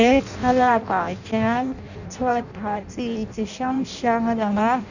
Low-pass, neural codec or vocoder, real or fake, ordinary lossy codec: 7.2 kHz; codec, 16 kHz in and 24 kHz out, 0.6 kbps, FireRedTTS-2 codec; fake; none